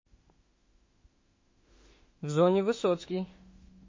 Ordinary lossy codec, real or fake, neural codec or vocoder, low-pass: MP3, 32 kbps; fake; autoencoder, 48 kHz, 32 numbers a frame, DAC-VAE, trained on Japanese speech; 7.2 kHz